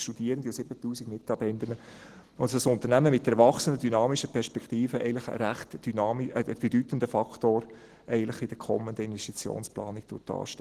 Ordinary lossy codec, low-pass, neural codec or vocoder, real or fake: Opus, 16 kbps; 14.4 kHz; none; real